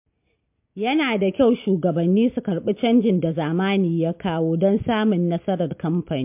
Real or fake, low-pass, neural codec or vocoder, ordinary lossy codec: real; 3.6 kHz; none; MP3, 32 kbps